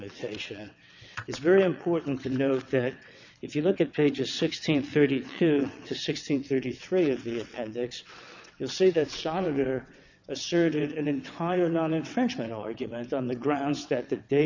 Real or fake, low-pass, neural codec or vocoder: fake; 7.2 kHz; vocoder, 22.05 kHz, 80 mel bands, WaveNeXt